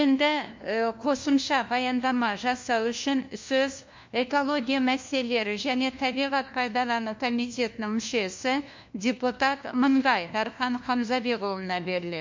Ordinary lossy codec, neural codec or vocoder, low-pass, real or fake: MP3, 48 kbps; codec, 16 kHz, 1 kbps, FunCodec, trained on LibriTTS, 50 frames a second; 7.2 kHz; fake